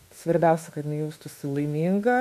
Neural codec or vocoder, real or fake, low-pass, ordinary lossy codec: autoencoder, 48 kHz, 32 numbers a frame, DAC-VAE, trained on Japanese speech; fake; 14.4 kHz; MP3, 64 kbps